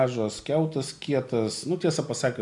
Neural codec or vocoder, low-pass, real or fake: none; 10.8 kHz; real